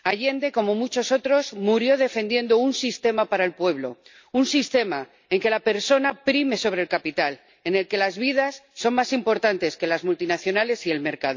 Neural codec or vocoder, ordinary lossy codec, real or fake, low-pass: none; MP3, 64 kbps; real; 7.2 kHz